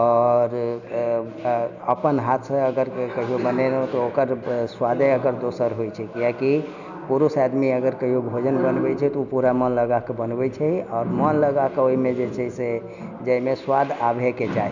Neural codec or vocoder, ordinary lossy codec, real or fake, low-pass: none; none; real; 7.2 kHz